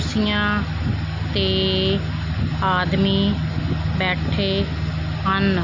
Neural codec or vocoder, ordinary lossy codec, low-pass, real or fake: none; MP3, 48 kbps; 7.2 kHz; real